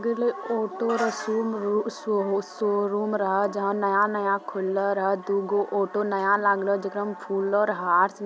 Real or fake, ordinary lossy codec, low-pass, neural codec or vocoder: real; none; none; none